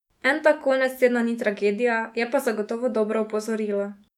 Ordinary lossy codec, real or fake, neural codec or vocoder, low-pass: none; fake; autoencoder, 48 kHz, 128 numbers a frame, DAC-VAE, trained on Japanese speech; 19.8 kHz